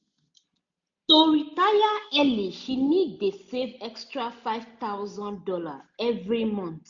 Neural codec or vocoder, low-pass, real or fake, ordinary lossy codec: none; 7.2 kHz; real; AAC, 64 kbps